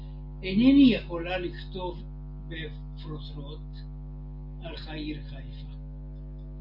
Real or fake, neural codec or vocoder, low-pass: real; none; 5.4 kHz